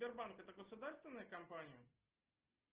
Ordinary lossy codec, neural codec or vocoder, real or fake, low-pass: Opus, 16 kbps; none; real; 3.6 kHz